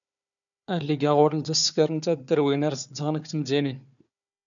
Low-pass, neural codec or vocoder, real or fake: 7.2 kHz; codec, 16 kHz, 4 kbps, FunCodec, trained on Chinese and English, 50 frames a second; fake